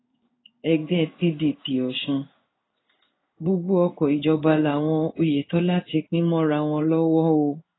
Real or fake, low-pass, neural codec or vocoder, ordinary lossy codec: fake; 7.2 kHz; codec, 16 kHz in and 24 kHz out, 1 kbps, XY-Tokenizer; AAC, 16 kbps